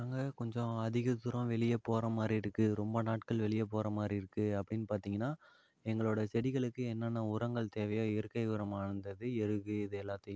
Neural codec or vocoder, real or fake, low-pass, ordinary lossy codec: none; real; none; none